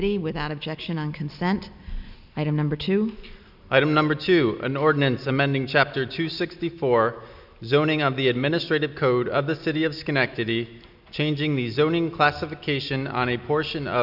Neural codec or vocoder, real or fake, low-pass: none; real; 5.4 kHz